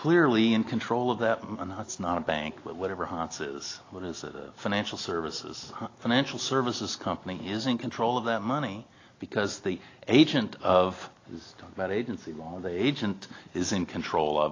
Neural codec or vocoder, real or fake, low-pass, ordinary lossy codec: none; real; 7.2 kHz; AAC, 32 kbps